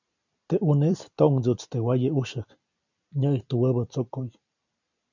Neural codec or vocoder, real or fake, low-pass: vocoder, 44.1 kHz, 128 mel bands every 256 samples, BigVGAN v2; fake; 7.2 kHz